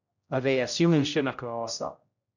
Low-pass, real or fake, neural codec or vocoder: 7.2 kHz; fake; codec, 16 kHz, 0.5 kbps, X-Codec, HuBERT features, trained on general audio